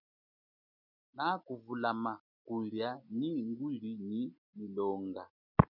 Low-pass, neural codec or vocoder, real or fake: 5.4 kHz; none; real